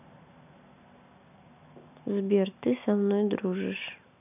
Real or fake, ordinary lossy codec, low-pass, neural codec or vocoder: real; none; 3.6 kHz; none